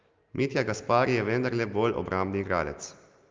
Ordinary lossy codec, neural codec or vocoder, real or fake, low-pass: Opus, 24 kbps; none; real; 7.2 kHz